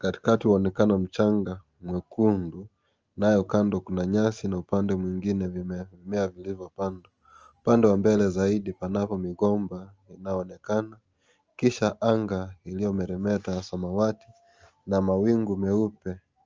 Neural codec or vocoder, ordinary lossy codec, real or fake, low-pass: none; Opus, 24 kbps; real; 7.2 kHz